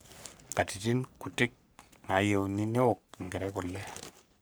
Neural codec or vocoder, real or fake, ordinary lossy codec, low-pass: codec, 44.1 kHz, 3.4 kbps, Pupu-Codec; fake; none; none